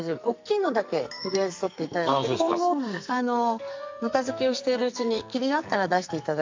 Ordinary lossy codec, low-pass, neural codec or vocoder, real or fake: MP3, 64 kbps; 7.2 kHz; codec, 44.1 kHz, 2.6 kbps, SNAC; fake